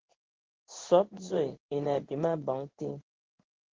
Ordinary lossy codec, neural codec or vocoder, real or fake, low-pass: Opus, 16 kbps; codec, 16 kHz in and 24 kHz out, 1 kbps, XY-Tokenizer; fake; 7.2 kHz